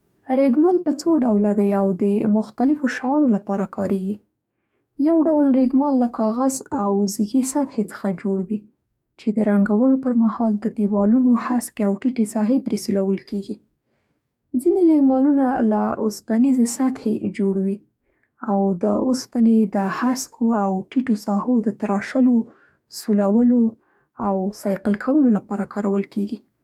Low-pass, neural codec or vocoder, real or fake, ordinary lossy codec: 19.8 kHz; codec, 44.1 kHz, 2.6 kbps, DAC; fake; none